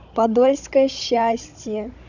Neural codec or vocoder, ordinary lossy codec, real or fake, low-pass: codec, 16 kHz, 16 kbps, FunCodec, trained on Chinese and English, 50 frames a second; none; fake; 7.2 kHz